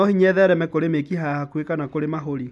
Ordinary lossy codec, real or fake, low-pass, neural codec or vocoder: none; real; none; none